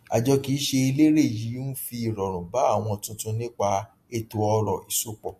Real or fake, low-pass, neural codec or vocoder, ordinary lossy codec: real; 14.4 kHz; none; MP3, 64 kbps